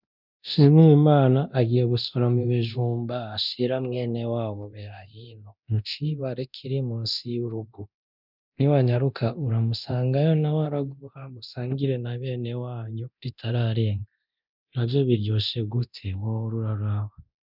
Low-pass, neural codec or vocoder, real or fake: 5.4 kHz; codec, 24 kHz, 0.9 kbps, DualCodec; fake